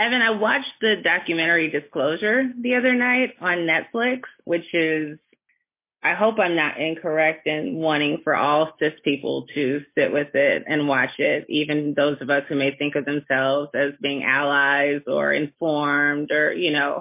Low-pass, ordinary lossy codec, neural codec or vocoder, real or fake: 3.6 kHz; MP3, 24 kbps; none; real